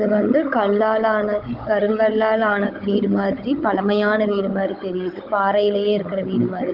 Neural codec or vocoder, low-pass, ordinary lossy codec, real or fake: codec, 16 kHz, 16 kbps, FunCodec, trained on Chinese and English, 50 frames a second; 5.4 kHz; Opus, 24 kbps; fake